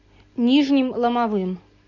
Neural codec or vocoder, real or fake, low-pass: none; real; 7.2 kHz